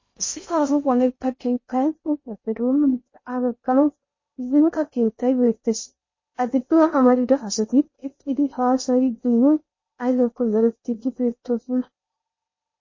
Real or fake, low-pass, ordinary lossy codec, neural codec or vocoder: fake; 7.2 kHz; MP3, 32 kbps; codec, 16 kHz in and 24 kHz out, 0.6 kbps, FocalCodec, streaming, 2048 codes